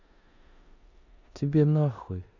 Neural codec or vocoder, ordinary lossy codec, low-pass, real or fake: codec, 16 kHz in and 24 kHz out, 0.9 kbps, LongCat-Audio-Codec, four codebook decoder; none; 7.2 kHz; fake